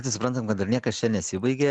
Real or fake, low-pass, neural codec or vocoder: real; 10.8 kHz; none